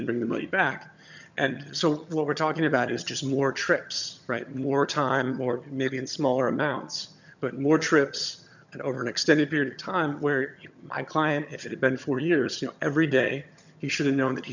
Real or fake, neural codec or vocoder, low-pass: fake; vocoder, 22.05 kHz, 80 mel bands, HiFi-GAN; 7.2 kHz